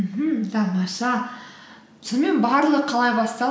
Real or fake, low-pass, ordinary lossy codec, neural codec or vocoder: real; none; none; none